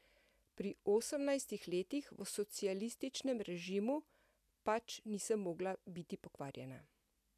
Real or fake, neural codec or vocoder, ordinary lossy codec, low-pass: real; none; none; 14.4 kHz